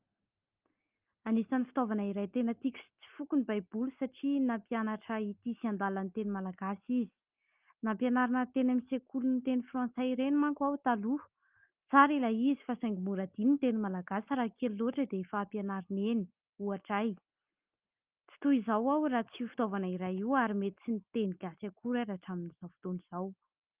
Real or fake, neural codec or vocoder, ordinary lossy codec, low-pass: real; none; Opus, 32 kbps; 3.6 kHz